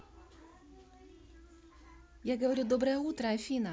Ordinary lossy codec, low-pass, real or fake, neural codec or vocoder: none; none; real; none